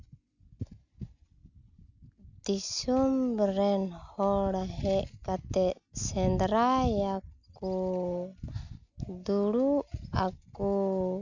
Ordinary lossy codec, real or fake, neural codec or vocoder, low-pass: none; real; none; 7.2 kHz